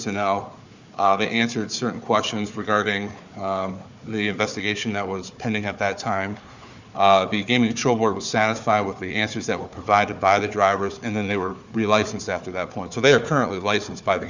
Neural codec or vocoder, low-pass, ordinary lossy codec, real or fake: codec, 16 kHz, 4 kbps, FunCodec, trained on Chinese and English, 50 frames a second; 7.2 kHz; Opus, 64 kbps; fake